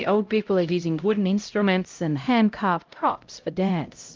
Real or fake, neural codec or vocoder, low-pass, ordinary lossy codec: fake; codec, 16 kHz, 0.5 kbps, X-Codec, HuBERT features, trained on LibriSpeech; 7.2 kHz; Opus, 32 kbps